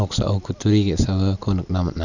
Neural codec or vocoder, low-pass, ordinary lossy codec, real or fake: vocoder, 44.1 kHz, 128 mel bands every 256 samples, BigVGAN v2; 7.2 kHz; none; fake